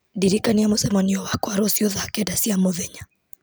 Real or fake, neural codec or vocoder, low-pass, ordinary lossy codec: real; none; none; none